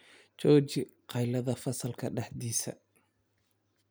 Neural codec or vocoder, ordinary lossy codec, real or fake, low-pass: none; none; real; none